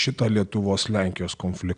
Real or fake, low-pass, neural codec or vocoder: real; 9.9 kHz; none